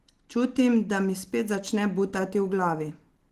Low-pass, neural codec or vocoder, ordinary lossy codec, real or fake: 14.4 kHz; none; Opus, 16 kbps; real